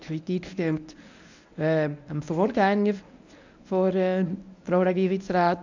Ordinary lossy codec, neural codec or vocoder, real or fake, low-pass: none; codec, 24 kHz, 0.9 kbps, WavTokenizer, medium speech release version 1; fake; 7.2 kHz